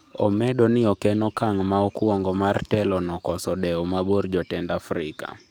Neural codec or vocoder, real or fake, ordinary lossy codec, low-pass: codec, 44.1 kHz, 7.8 kbps, DAC; fake; none; none